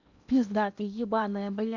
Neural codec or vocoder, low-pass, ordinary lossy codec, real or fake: codec, 16 kHz in and 24 kHz out, 0.8 kbps, FocalCodec, streaming, 65536 codes; 7.2 kHz; none; fake